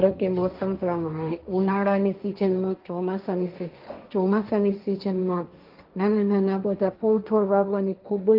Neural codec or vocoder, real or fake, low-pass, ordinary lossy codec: codec, 16 kHz, 1.1 kbps, Voila-Tokenizer; fake; 5.4 kHz; Opus, 32 kbps